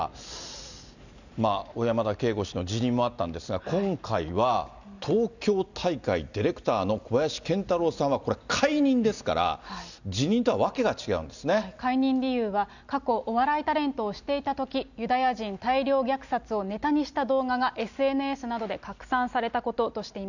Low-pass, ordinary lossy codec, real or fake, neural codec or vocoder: 7.2 kHz; none; real; none